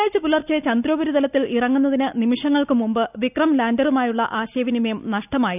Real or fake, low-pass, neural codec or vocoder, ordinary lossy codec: real; 3.6 kHz; none; none